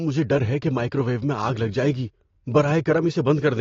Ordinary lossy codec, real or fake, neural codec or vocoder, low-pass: AAC, 32 kbps; real; none; 7.2 kHz